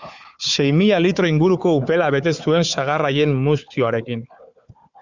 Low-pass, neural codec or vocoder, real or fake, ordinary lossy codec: 7.2 kHz; codec, 16 kHz, 4 kbps, FunCodec, trained on Chinese and English, 50 frames a second; fake; Opus, 64 kbps